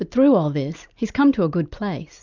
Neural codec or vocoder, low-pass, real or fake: none; 7.2 kHz; real